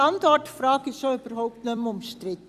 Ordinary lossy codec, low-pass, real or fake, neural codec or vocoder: Opus, 64 kbps; 14.4 kHz; fake; vocoder, 44.1 kHz, 128 mel bands every 256 samples, BigVGAN v2